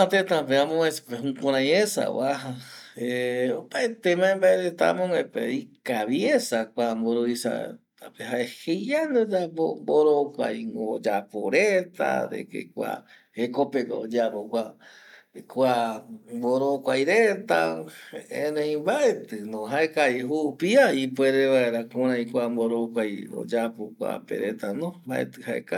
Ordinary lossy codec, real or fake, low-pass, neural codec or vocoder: none; real; 19.8 kHz; none